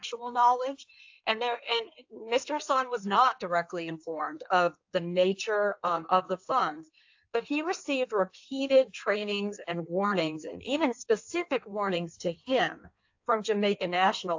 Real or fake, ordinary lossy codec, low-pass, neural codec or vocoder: fake; AAC, 48 kbps; 7.2 kHz; codec, 16 kHz in and 24 kHz out, 1.1 kbps, FireRedTTS-2 codec